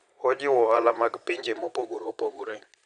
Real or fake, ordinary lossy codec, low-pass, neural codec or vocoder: fake; none; 9.9 kHz; vocoder, 22.05 kHz, 80 mel bands, Vocos